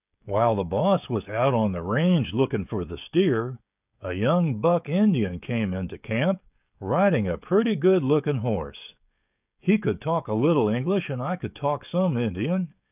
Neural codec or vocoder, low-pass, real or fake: codec, 16 kHz, 16 kbps, FreqCodec, smaller model; 3.6 kHz; fake